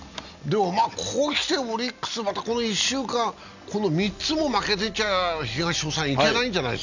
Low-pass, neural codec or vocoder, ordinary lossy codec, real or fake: 7.2 kHz; none; none; real